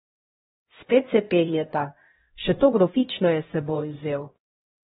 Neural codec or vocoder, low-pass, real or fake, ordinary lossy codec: codec, 16 kHz, 0.5 kbps, X-Codec, HuBERT features, trained on LibriSpeech; 7.2 kHz; fake; AAC, 16 kbps